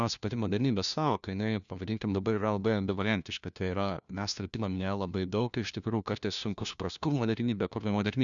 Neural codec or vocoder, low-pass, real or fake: codec, 16 kHz, 1 kbps, FunCodec, trained on LibriTTS, 50 frames a second; 7.2 kHz; fake